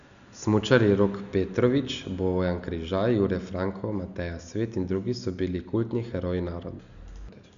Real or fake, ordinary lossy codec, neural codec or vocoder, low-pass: real; none; none; 7.2 kHz